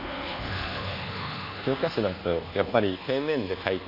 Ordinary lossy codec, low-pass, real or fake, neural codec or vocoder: none; 5.4 kHz; fake; codec, 24 kHz, 1.2 kbps, DualCodec